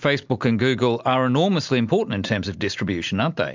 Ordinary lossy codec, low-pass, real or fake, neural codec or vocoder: MP3, 64 kbps; 7.2 kHz; real; none